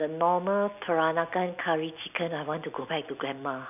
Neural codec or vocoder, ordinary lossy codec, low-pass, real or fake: none; none; 3.6 kHz; real